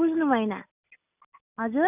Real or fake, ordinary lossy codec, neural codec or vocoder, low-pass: real; none; none; 3.6 kHz